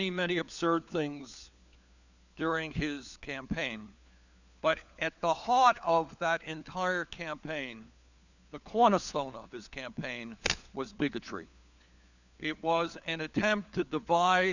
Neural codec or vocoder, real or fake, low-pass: codec, 16 kHz, 4 kbps, FunCodec, trained on LibriTTS, 50 frames a second; fake; 7.2 kHz